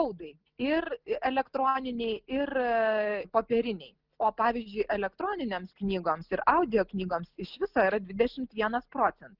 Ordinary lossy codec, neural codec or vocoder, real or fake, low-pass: Opus, 24 kbps; none; real; 5.4 kHz